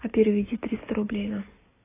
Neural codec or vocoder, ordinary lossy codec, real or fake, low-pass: none; AAC, 16 kbps; real; 3.6 kHz